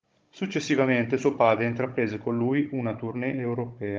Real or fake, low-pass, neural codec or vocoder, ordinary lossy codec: fake; 7.2 kHz; codec, 16 kHz, 16 kbps, FunCodec, trained on Chinese and English, 50 frames a second; Opus, 32 kbps